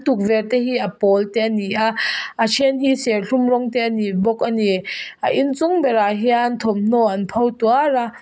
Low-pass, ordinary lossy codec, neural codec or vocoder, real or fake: none; none; none; real